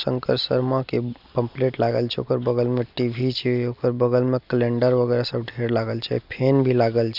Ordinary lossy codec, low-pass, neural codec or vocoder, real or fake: none; 5.4 kHz; none; real